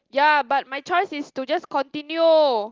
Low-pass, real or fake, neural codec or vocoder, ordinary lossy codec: 7.2 kHz; real; none; Opus, 24 kbps